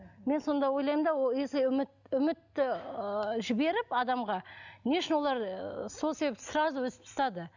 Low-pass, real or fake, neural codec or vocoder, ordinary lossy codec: 7.2 kHz; real; none; none